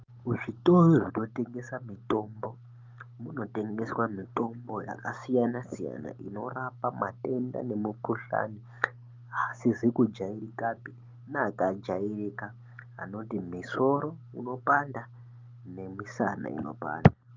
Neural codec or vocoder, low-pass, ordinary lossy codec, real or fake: none; 7.2 kHz; Opus, 32 kbps; real